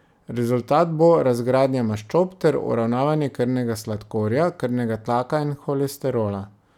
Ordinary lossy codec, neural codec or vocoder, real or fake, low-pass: none; none; real; 19.8 kHz